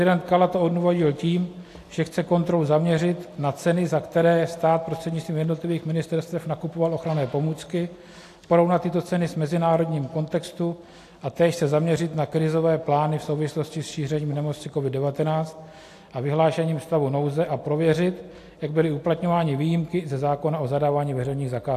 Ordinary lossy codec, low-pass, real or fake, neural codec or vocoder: AAC, 64 kbps; 14.4 kHz; real; none